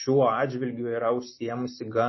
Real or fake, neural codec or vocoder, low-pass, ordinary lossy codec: real; none; 7.2 kHz; MP3, 24 kbps